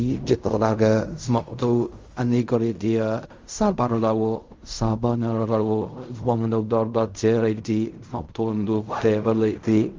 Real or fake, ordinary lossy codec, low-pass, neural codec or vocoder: fake; Opus, 32 kbps; 7.2 kHz; codec, 16 kHz in and 24 kHz out, 0.4 kbps, LongCat-Audio-Codec, fine tuned four codebook decoder